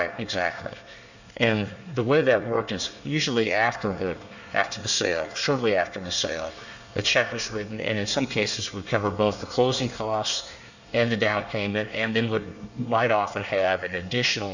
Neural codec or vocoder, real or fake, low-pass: codec, 24 kHz, 1 kbps, SNAC; fake; 7.2 kHz